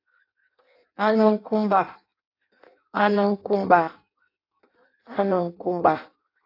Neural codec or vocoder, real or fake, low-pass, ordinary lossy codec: codec, 16 kHz in and 24 kHz out, 0.6 kbps, FireRedTTS-2 codec; fake; 5.4 kHz; AAC, 32 kbps